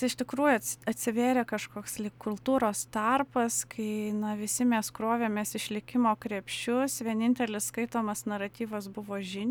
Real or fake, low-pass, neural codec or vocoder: real; 19.8 kHz; none